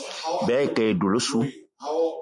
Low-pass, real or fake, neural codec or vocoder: 10.8 kHz; real; none